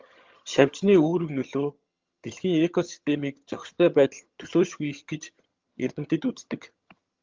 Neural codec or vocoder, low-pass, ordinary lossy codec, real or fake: vocoder, 22.05 kHz, 80 mel bands, HiFi-GAN; 7.2 kHz; Opus, 32 kbps; fake